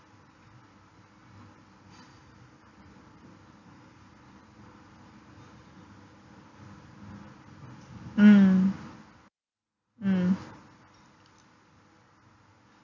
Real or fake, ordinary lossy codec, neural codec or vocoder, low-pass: real; none; none; 7.2 kHz